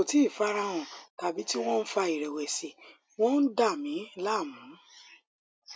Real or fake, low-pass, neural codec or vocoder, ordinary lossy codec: real; none; none; none